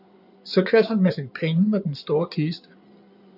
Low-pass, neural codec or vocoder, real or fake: 5.4 kHz; codec, 16 kHz in and 24 kHz out, 2.2 kbps, FireRedTTS-2 codec; fake